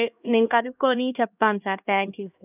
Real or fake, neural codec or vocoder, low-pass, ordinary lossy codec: fake; codec, 16 kHz, 1 kbps, X-Codec, HuBERT features, trained on LibriSpeech; 3.6 kHz; none